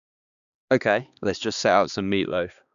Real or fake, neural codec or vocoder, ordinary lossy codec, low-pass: fake; codec, 16 kHz, 2 kbps, X-Codec, HuBERT features, trained on balanced general audio; none; 7.2 kHz